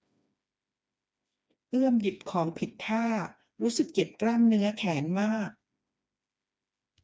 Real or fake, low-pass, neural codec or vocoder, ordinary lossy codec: fake; none; codec, 16 kHz, 2 kbps, FreqCodec, smaller model; none